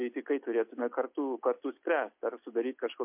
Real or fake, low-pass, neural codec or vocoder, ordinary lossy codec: real; 3.6 kHz; none; MP3, 32 kbps